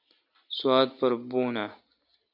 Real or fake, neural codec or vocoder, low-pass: real; none; 5.4 kHz